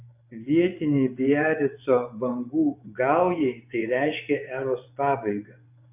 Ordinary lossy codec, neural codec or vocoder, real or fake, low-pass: AAC, 32 kbps; vocoder, 24 kHz, 100 mel bands, Vocos; fake; 3.6 kHz